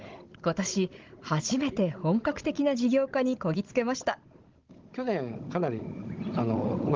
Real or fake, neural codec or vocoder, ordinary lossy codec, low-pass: fake; codec, 16 kHz, 16 kbps, FunCodec, trained on Chinese and English, 50 frames a second; Opus, 16 kbps; 7.2 kHz